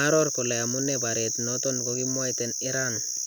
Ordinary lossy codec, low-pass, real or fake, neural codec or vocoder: none; none; real; none